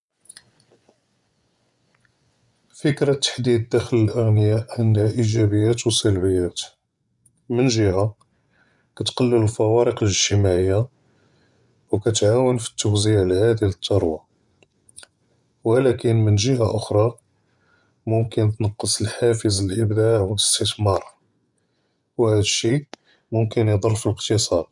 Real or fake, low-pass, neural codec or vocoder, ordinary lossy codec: fake; 10.8 kHz; vocoder, 24 kHz, 100 mel bands, Vocos; none